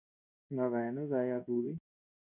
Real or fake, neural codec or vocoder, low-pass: fake; codec, 16 kHz in and 24 kHz out, 1 kbps, XY-Tokenizer; 3.6 kHz